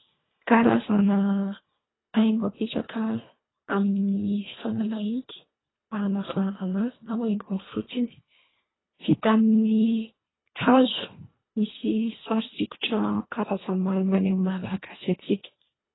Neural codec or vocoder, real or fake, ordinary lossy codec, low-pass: codec, 24 kHz, 1.5 kbps, HILCodec; fake; AAC, 16 kbps; 7.2 kHz